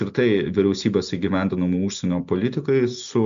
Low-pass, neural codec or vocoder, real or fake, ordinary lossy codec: 7.2 kHz; none; real; MP3, 64 kbps